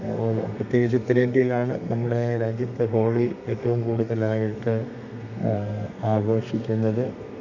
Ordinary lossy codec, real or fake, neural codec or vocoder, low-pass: none; fake; codec, 32 kHz, 1.9 kbps, SNAC; 7.2 kHz